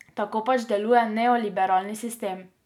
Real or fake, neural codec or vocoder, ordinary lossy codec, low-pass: real; none; none; 19.8 kHz